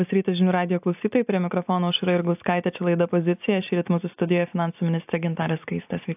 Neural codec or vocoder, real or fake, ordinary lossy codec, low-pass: none; real; AAC, 32 kbps; 3.6 kHz